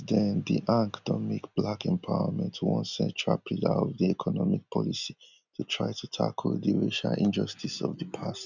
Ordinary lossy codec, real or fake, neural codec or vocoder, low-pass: none; real; none; 7.2 kHz